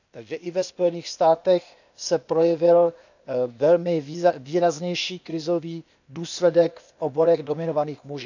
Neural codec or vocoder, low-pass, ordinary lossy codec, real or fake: codec, 16 kHz, 0.8 kbps, ZipCodec; 7.2 kHz; none; fake